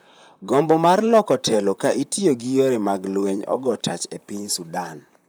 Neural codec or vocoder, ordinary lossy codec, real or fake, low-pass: vocoder, 44.1 kHz, 128 mel bands, Pupu-Vocoder; none; fake; none